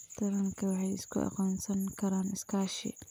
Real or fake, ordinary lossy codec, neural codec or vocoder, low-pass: real; none; none; none